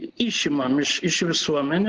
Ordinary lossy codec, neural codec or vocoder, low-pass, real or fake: Opus, 16 kbps; none; 7.2 kHz; real